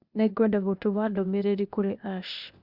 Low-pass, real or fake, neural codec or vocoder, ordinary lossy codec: 5.4 kHz; fake; codec, 16 kHz, 0.8 kbps, ZipCodec; Opus, 64 kbps